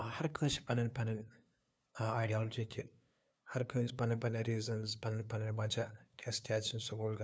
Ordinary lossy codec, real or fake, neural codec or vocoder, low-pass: none; fake; codec, 16 kHz, 2 kbps, FunCodec, trained on LibriTTS, 25 frames a second; none